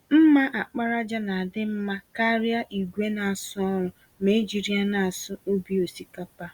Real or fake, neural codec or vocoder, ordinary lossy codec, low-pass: real; none; Opus, 64 kbps; 19.8 kHz